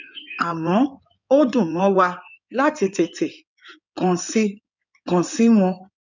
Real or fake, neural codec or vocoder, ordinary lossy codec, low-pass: fake; codec, 16 kHz in and 24 kHz out, 2.2 kbps, FireRedTTS-2 codec; none; 7.2 kHz